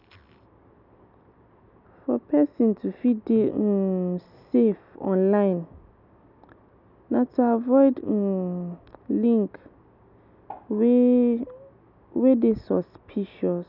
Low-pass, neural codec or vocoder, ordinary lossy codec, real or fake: 5.4 kHz; none; none; real